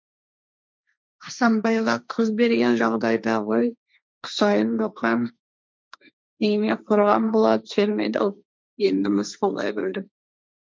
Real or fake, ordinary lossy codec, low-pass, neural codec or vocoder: fake; none; none; codec, 16 kHz, 1.1 kbps, Voila-Tokenizer